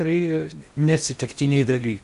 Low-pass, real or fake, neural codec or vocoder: 10.8 kHz; fake; codec, 16 kHz in and 24 kHz out, 0.6 kbps, FocalCodec, streaming, 4096 codes